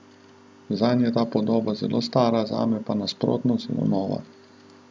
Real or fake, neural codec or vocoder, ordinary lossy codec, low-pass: real; none; none; none